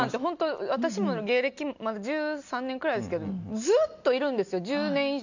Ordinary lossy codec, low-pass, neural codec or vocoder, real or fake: none; 7.2 kHz; none; real